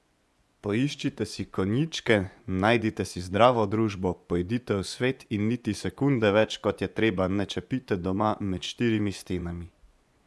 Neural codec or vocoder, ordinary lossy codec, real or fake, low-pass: vocoder, 24 kHz, 100 mel bands, Vocos; none; fake; none